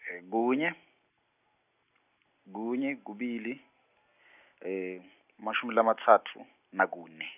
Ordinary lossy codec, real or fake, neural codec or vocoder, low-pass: none; real; none; 3.6 kHz